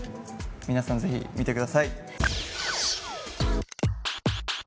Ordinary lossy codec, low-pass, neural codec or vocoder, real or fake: none; none; none; real